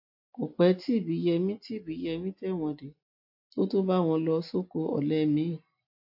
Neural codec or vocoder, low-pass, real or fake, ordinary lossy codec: none; 5.4 kHz; real; AAC, 48 kbps